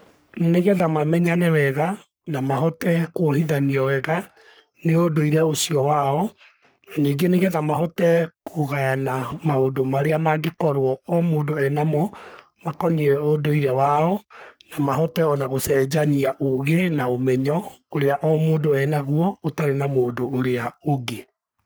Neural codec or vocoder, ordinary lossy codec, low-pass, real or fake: codec, 44.1 kHz, 3.4 kbps, Pupu-Codec; none; none; fake